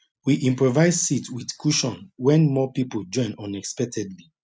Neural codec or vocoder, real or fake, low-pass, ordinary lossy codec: none; real; none; none